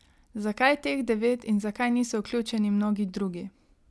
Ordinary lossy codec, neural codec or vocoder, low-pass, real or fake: none; none; none; real